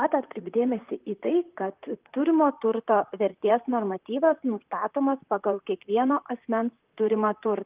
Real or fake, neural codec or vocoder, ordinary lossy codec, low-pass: fake; vocoder, 44.1 kHz, 128 mel bands, Pupu-Vocoder; Opus, 32 kbps; 3.6 kHz